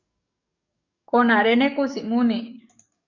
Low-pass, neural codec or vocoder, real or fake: 7.2 kHz; codec, 44.1 kHz, 7.8 kbps, DAC; fake